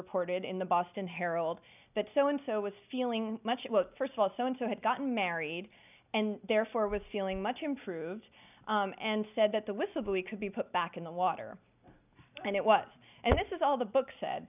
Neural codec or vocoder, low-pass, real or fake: none; 3.6 kHz; real